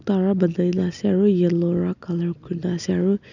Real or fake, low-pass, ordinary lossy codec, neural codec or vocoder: real; 7.2 kHz; none; none